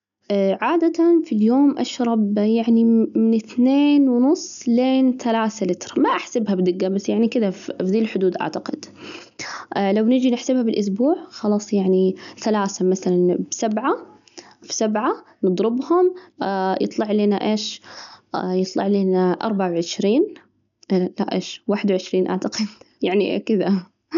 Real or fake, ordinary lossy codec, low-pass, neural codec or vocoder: real; none; 7.2 kHz; none